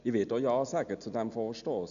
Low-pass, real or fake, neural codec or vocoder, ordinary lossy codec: 7.2 kHz; real; none; none